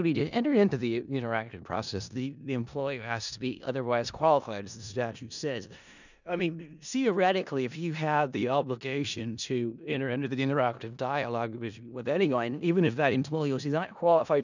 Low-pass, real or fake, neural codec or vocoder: 7.2 kHz; fake; codec, 16 kHz in and 24 kHz out, 0.4 kbps, LongCat-Audio-Codec, four codebook decoder